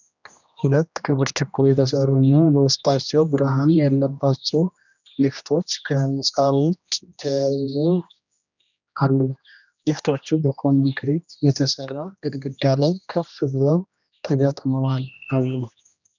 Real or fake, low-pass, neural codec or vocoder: fake; 7.2 kHz; codec, 16 kHz, 1 kbps, X-Codec, HuBERT features, trained on general audio